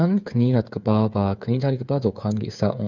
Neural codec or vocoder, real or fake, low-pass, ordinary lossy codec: codec, 16 kHz, 8 kbps, FreqCodec, smaller model; fake; 7.2 kHz; none